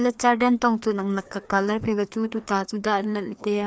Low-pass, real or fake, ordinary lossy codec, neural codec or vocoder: none; fake; none; codec, 16 kHz, 2 kbps, FreqCodec, larger model